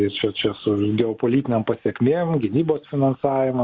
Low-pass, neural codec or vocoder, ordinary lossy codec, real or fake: 7.2 kHz; none; Opus, 64 kbps; real